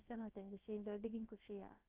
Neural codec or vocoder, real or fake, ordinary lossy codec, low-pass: codec, 16 kHz, about 1 kbps, DyCAST, with the encoder's durations; fake; Opus, 32 kbps; 3.6 kHz